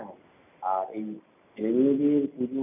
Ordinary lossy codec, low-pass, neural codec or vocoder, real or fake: none; 3.6 kHz; none; real